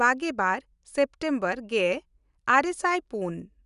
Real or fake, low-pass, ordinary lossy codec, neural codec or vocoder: real; 10.8 kHz; none; none